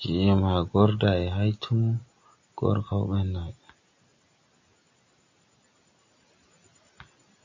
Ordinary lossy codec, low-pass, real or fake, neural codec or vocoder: AAC, 48 kbps; 7.2 kHz; real; none